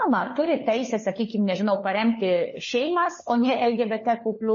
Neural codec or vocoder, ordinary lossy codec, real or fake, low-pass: codec, 16 kHz, 4 kbps, FunCodec, trained on LibriTTS, 50 frames a second; MP3, 32 kbps; fake; 7.2 kHz